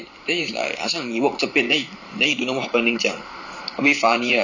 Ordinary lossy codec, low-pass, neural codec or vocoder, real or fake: none; 7.2 kHz; vocoder, 44.1 kHz, 128 mel bands every 512 samples, BigVGAN v2; fake